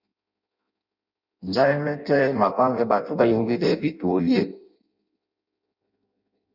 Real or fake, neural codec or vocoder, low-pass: fake; codec, 16 kHz in and 24 kHz out, 0.6 kbps, FireRedTTS-2 codec; 5.4 kHz